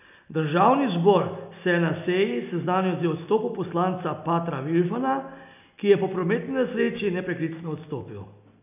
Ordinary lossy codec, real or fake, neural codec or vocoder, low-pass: none; real; none; 3.6 kHz